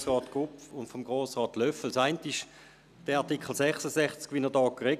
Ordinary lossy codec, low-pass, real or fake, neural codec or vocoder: none; 14.4 kHz; real; none